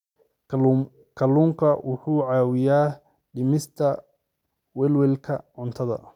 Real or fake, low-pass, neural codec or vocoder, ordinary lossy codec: real; 19.8 kHz; none; none